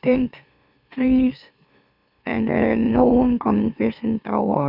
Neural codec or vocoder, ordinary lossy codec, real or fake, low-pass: autoencoder, 44.1 kHz, a latent of 192 numbers a frame, MeloTTS; none; fake; 5.4 kHz